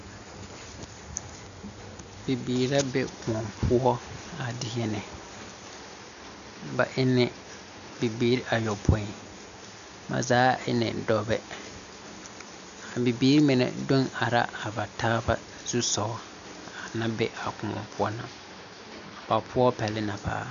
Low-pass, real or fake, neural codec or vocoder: 7.2 kHz; real; none